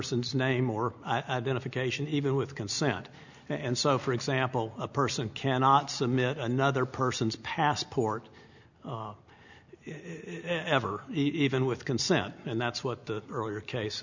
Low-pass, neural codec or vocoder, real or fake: 7.2 kHz; none; real